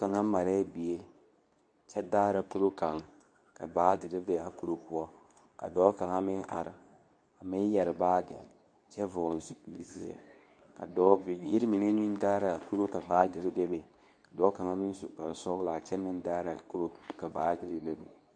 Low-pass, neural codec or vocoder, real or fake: 9.9 kHz; codec, 24 kHz, 0.9 kbps, WavTokenizer, medium speech release version 2; fake